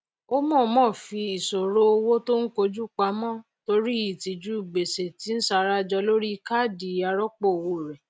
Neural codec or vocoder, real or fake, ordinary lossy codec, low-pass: none; real; none; none